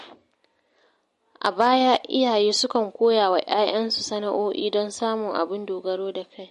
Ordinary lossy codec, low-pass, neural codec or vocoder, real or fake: MP3, 48 kbps; 14.4 kHz; none; real